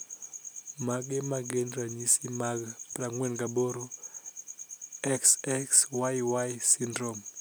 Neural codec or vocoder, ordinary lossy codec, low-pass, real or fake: none; none; none; real